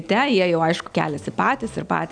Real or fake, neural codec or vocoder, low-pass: real; none; 9.9 kHz